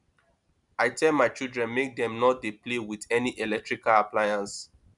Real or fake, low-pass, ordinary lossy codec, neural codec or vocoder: real; 10.8 kHz; none; none